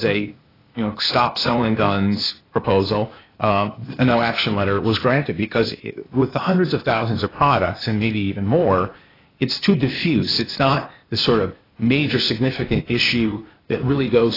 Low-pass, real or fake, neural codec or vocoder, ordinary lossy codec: 5.4 kHz; fake; codec, 16 kHz, 0.8 kbps, ZipCodec; AAC, 24 kbps